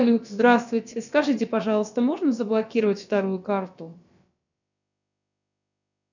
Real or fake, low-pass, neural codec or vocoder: fake; 7.2 kHz; codec, 16 kHz, about 1 kbps, DyCAST, with the encoder's durations